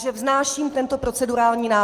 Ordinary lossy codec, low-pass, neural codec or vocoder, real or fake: Opus, 32 kbps; 14.4 kHz; none; real